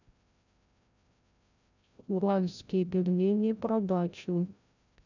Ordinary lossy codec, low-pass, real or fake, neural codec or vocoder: none; 7.2 kHz; fake; codec, 16 kHz, 0.5 kbps, FreqCodec, larger model